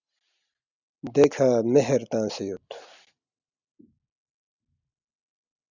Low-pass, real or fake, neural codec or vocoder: 7.2 kHz; real; none